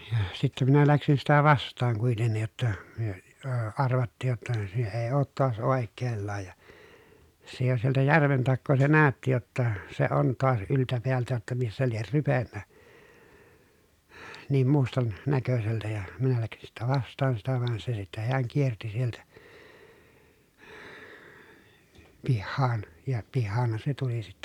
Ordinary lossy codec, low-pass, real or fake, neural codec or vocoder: none; 19.8 kHz; real; none